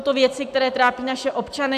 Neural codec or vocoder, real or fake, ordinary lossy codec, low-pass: none; real; AAC, 96 kbps; 14.4 kHz